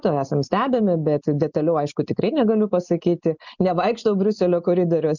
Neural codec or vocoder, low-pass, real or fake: none; 7.2 kHz; real